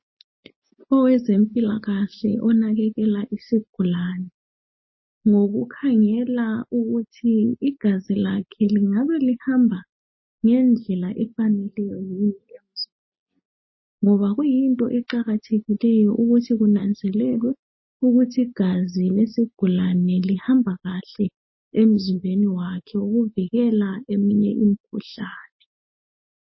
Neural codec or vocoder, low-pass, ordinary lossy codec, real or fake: none; 7.2 kHz; MP3, 24 kbps; real